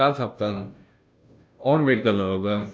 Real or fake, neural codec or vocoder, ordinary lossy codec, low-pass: fake; codec, 16 kHz, 0.5 kbps, FunCodec, trained on Chinese and English, 25 frames a second; none; none